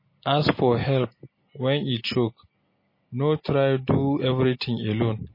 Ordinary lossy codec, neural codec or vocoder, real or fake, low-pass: MP3, 24 kbps; none; real; 5.4 kHz